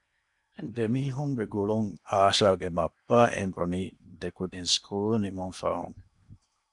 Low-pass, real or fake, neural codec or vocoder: 10.8 kHz; fake; codec, 16 kHz in and 24 kHz out, 0.8 kbps, FocalCodec, streaming, 65536 codes